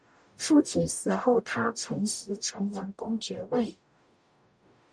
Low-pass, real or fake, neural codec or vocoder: 9.9 kHz; fake; codec, 44.1 kHz, 0.9 kbps, DAC